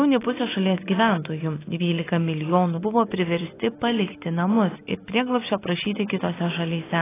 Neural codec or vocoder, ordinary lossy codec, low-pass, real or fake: none; AAC, 16 kbps; 3.6 kHz; real